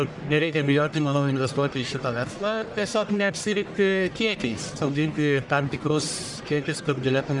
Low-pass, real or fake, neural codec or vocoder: 10.8 kHz; fake; codec, 44.1 kHz, 1.7 kbps, Pupu-Codec